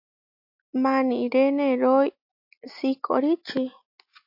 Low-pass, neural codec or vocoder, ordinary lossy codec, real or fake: 5.4 kHz; none; AAC, 48 kbps; real